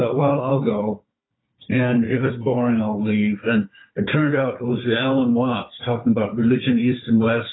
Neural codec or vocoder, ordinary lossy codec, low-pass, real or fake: codec, 16 kHz, 4 kbps, FunCodec, trained on Chinese and English, 50 frames a second; AAC, 16 kbps; 7.2 kHz; fake